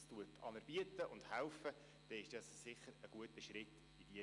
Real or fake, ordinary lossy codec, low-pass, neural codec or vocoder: real; none; 10.8 kHz; none